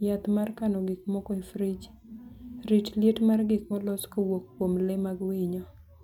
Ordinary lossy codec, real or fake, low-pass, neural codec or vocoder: none; real; 19.8 kHz; none